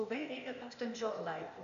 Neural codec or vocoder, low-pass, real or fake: codec, 16 kHz, 0.8 kbps, ZipCodec; 7.2 kHz; fake